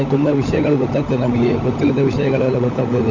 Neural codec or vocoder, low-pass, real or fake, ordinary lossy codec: codec, 16 kHz, 16 kbps, FunCodec, trained on LibriTTS, 50 frames a second; 7.2 kHz; fake; none